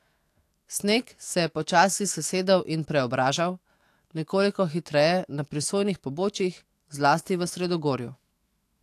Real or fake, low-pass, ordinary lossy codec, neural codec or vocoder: fake; 14.4 kHz; AAC, 64 kbps; autoencoder, 48 kHz, 128 numbers a frame, DAC-VAE, trained on Japanese speech